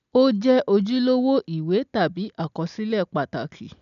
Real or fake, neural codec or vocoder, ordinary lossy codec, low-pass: real; none; none; 7.2 kHz